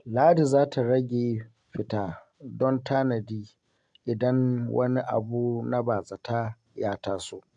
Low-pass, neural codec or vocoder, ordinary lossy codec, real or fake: 9.9 kHz; none; AAC, 64 kbps; real